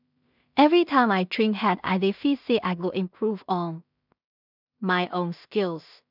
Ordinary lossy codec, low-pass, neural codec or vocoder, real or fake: none; 5.4 kHz; codec, 16 kHz in and 24 kHz out, 0.4 kbps, LongCat-Audio-Codec, two codebook decoder; fake